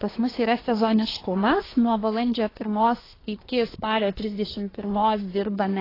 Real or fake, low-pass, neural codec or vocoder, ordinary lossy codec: fake; 5.4 kHz; codec, 24 kHz, 1 kbps, SNAC; AAC, 24 kbps